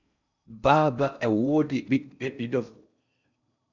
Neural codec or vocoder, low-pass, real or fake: codec, 16 kHz in and 24 kHz out, 0.6 kbps, FocalCodec, streaming, 4096 codes; 7.2 kHz; fake